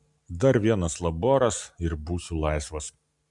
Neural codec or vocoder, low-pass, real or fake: none; 10.8 kHz; real